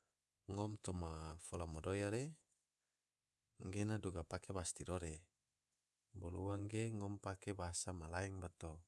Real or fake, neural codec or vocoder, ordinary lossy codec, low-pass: fake; codec, 24 kHz, 3.1 kbps, DualCodec; none; none